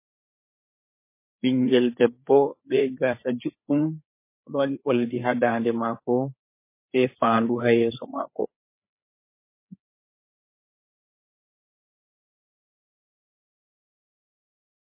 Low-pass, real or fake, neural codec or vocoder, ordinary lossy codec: 3.6 kHz; fake; codec, 16 kHz, 4 kbps, FreqCodec, larger model; MP3, 24 kbps